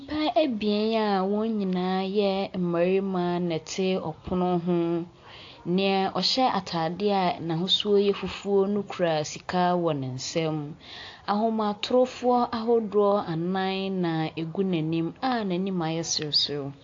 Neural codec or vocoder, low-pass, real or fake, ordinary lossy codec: none; 7.2 kHz; real; AAC, 48 kbps